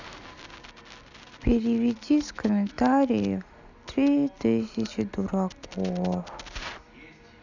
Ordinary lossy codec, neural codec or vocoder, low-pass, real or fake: none; none; 7.2 kHz; real